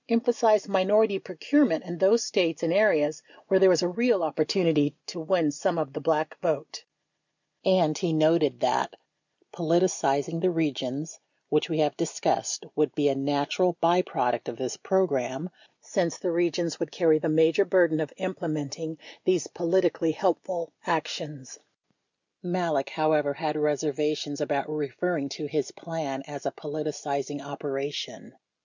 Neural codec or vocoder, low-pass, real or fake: none; 7.2 kHz; real